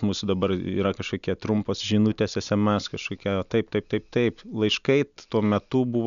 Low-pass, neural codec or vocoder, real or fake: 7.2 kHz; none; real